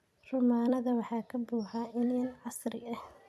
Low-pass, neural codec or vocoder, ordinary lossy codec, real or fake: 14.4 kHz; vocoder, 44.1 kHz, 128 mel bands every 256 samples, BigVGAN v2; none; fake